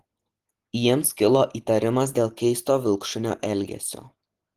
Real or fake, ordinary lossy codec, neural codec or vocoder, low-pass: real; Opus, 16 kbps; none; 14.4 kHz